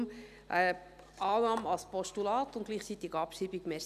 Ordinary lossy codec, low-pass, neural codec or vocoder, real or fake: none; 14.4 kHz; none; real